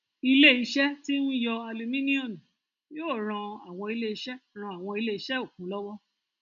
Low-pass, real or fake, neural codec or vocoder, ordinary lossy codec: 7.2 kHz; real; none; none